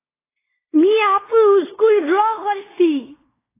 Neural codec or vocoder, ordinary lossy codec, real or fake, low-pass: codec, 16 kHz in and 24 kHz out, 0.9 kbps, LongCat-Audio-Codec, fine tuned four codebook decoder; AAC, 16 kbps; fake; 3.6 kHz